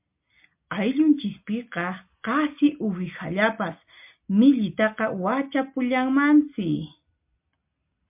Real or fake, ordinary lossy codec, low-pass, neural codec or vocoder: real; MP3, 32 kbps; 3.6 kHz; none